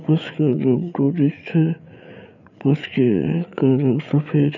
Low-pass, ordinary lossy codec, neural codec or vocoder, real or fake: 7.2 kHz; none; codec, 16 kHz, 8 kbps, FreqCodec, larger model; fake